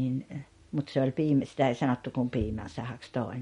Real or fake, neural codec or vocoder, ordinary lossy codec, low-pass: real; none; MP3, 48 kbps; 10.8 kHz